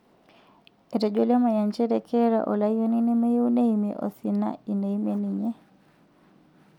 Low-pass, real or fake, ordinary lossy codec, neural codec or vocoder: 19.8 kHz; real; none; none